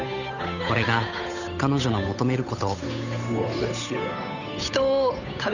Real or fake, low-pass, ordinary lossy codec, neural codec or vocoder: fake; 7.2 kHz; none; codec, 16 kHz, 8 kbps, FunCodec, trained on Chinese and English, 25 frames a second